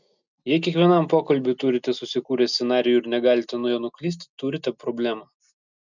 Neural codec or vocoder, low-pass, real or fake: none; 7.2 kHz; real